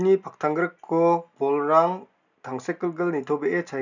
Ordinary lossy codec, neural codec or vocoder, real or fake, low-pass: none; none; real; 7.2 kHz